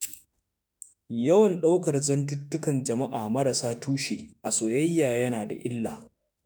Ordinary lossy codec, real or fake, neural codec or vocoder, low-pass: none; fake; autoencoder, 48 kHz, 32 numbers a frame, DAC-VAE, trained on Japanese speech; none